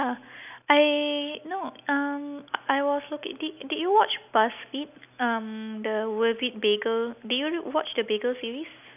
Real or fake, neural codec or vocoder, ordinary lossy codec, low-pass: real; none; none; 3.6 kHz